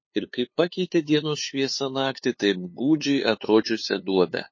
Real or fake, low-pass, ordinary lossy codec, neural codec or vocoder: fake; 7.2 kHz; MP3, 32 kbps; codec, 16 kHz, 2 kbps, FunCodec, trained on LibriTTS, 25 frames a second